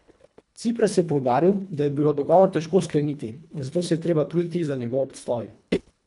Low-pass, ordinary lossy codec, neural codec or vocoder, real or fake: 10.8 kHz; none; codec, 24 kHz, 1.5 kbps, HILCodec; fake